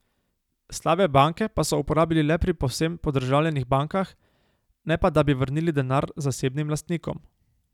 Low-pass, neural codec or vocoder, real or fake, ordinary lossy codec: 19.8 kHz; none; real; none